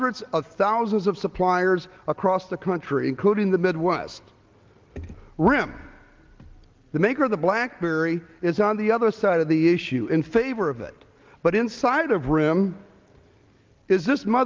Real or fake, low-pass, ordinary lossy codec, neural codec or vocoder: real; 7.2 kHz; Opus, 32 kbps; none